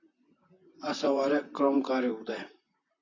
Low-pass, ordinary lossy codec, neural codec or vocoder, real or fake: 7.2 kHz; AAC, 32 kbps; vocoder, 44.1 kHz, 128 mel bands, Pupu-Vocoder; fake